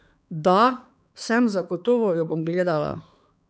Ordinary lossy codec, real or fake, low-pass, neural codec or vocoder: none; fake; none; codec, 16 kHz, 4 kbps, X-Codec, HuBERT features, trained on balanced general audio